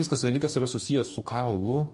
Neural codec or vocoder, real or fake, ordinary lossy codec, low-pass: codec, 44.1 kHz, 2.6 kbps, DAC; fake; MP3, 48 kbps; 14.4 kHz